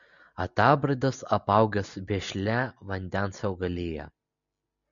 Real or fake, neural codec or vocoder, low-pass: real; none; 7.2 kHz